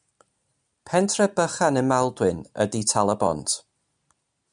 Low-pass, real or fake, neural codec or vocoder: 9.9 kHz; real; none